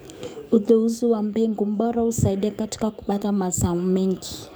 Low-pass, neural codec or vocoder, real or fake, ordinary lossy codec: none; codec, 44.1 kHz, 7.8 kbps, DAC; fake; none